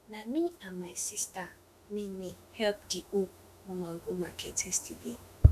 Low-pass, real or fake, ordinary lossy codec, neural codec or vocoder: 14.4 kHz; fake; none; autoencoder, 48 kHz, 32 numbers a frame, DAC-VAE, trained on Japanese speech